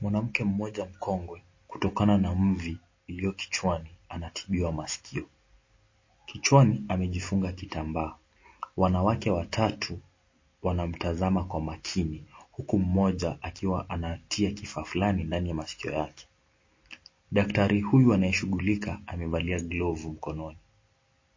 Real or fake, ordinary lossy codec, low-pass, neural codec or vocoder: real; MP3, 32 kbps; 7.2 kHz; none